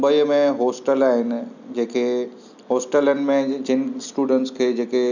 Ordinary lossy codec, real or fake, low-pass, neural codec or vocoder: none; real; 7.2 kHz; none